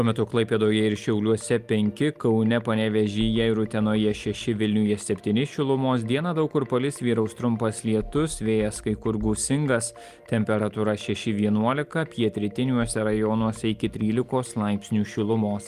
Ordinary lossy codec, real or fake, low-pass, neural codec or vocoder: Opus, 32 kbps; real; 14.4 kHz; none